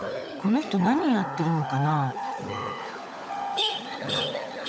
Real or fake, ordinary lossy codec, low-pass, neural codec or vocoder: fake; none; none; codec, 16 kHz, 16 kbps, FunCodec, trained on LibriTTS, 50 frames a second